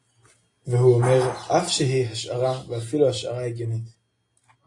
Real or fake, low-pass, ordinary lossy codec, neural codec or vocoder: real; 10.8 kHz; AAC, 32 kbps; none